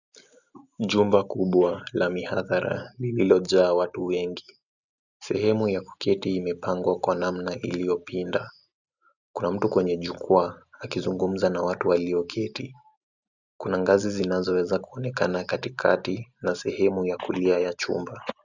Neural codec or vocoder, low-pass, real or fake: none; 7.2 kHz; real